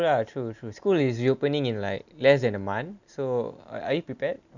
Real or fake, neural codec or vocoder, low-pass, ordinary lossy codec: real; none; 7.2 kHz; none